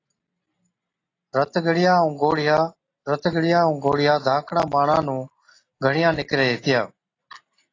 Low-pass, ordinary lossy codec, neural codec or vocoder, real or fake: 7.2 kHz; AAC, 32 kbps; none; real